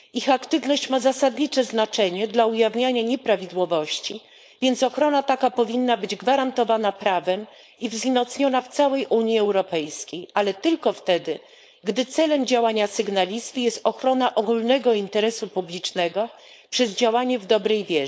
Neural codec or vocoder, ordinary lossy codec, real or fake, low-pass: codec, 16 kHz, 4.8 kbps, FACodec; none; fake; none